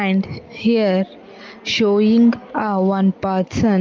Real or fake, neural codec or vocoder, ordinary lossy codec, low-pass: real; none; Opus, 32 kbps; 7.2 kHz